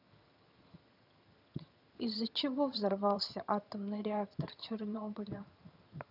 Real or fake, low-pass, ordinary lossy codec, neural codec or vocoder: fake; 5.4 kHz; none; vocoder, 22.05 kHz, 80 mel bands, HiFi-GAN